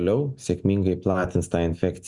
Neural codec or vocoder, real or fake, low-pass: none; real; 10.8 kHz